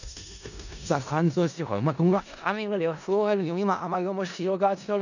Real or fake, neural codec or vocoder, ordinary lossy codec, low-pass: fake; codec, 16 kHz in and 24 kHz out, 0.4 kbps, LongCat-Audio-Codec, four codebook decoder; none; 7.2 kHz